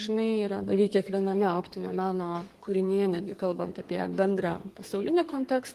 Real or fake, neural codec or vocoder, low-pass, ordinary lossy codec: fake; codec, 32 kHz, 1.9 kbps, SNAC; 14.4 kHz; Opus, 24 kbps